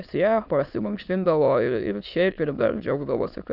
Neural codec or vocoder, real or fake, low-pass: autoencoder, 22.05 kHz, a latent of 192 numbers a frame, VITS, trained on many speakers; fake; 5.4 kHz